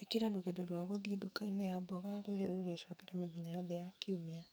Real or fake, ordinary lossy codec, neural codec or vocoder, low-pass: fake; none; codec, 44.1 kHz, 2.6 kbps, SNAC; none